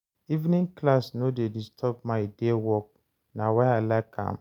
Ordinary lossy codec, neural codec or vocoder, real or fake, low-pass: none; none; real; 19.8 kHz